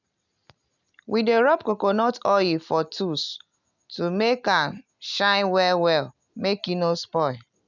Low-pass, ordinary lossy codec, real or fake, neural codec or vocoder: 7.2 kHz; none; real; none